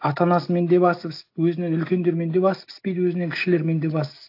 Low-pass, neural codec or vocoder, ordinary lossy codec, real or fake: 5.4 kHz; none; AAC, 32 kbps; real